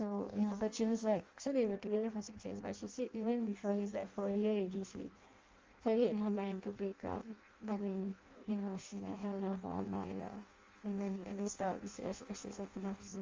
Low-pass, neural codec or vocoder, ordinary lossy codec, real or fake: 7.2 kHz; codec, 16 kHz in and 24 kHz out, 0.6 kbps, FireRedTTS-2 codec; Opus, 32 kbps; fake